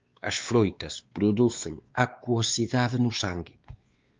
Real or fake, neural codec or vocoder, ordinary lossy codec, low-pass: fake; codec, 16 kHz, 2 kbps, X-Codec, WavLM features, trained on Multilingual LibriSpeech; Opus, 24 kbps; 7.2 kHz